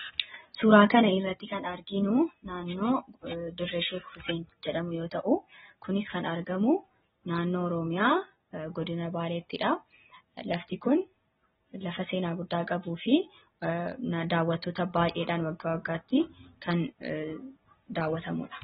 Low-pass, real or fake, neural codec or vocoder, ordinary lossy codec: 10.8 kHz; real; none; AAC, 16 kbps